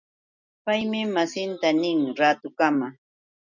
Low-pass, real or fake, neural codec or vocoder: 7.2 kHz; real; none